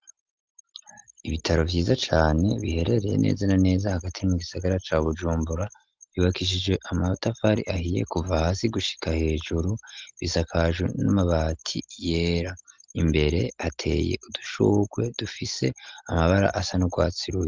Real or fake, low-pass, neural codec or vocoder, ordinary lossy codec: real; 7.2 kHz; none; Opus, 32 kbps